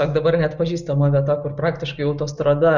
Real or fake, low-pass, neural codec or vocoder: real; 7.2 kHz; none